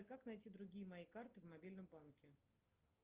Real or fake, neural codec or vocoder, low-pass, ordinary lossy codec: real; none; 3.6 kHz; Opus, 32 kbps